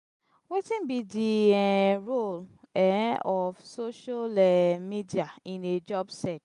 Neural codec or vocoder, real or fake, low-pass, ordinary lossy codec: none; real; 10.8 kHz; none